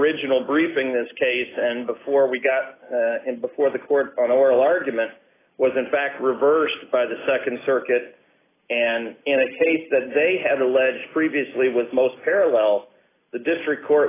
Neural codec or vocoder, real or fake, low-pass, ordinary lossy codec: none; real; 3.6 kHz; AAC, 16 kbps